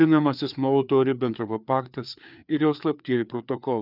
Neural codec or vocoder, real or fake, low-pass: codec, 16 kHz, 4 kbps, FreqCodec, larger model; fake; 5.4 kHz